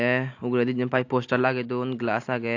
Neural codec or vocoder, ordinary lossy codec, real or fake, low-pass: none; AAC, 48 kbps; real; 7.2 kHz